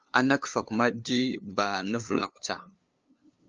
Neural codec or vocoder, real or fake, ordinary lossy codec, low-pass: codec, 16 kHz, 2 kbps, FunCodec, trained on LibriTTS, 25 frames a second; fake; Opus, 24 kbps; 7.2 kHz